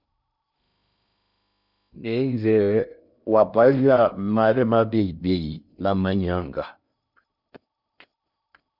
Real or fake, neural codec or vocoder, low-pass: fake; codec, 16 kHz in and 24 kHz out, 0.6 kbps, FocalCodec, streaming, 2048 codes; 5.4 kHz